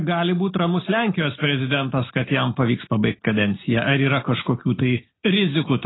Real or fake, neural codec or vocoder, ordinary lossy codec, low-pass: real; none; AAC, 16 kbps; 7.2 kHz